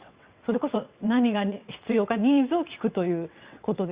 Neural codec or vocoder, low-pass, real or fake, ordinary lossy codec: vocoder, 22.05 kHz, 80 mel bands, WaveNeXt; 3.6 kHz; fake; Opus, 64 kbps